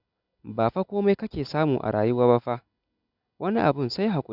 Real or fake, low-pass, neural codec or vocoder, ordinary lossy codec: real; 5.4 kHz; none; none